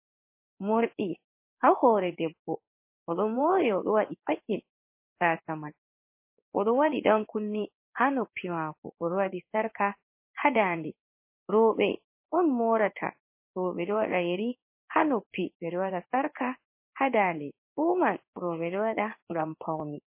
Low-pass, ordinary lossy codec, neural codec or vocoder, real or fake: 3.6 kHz; MP3, 24 kbps; codec, 16 kHz in and 24 kHz out, 1 kbps, XY-Tokenizer; fake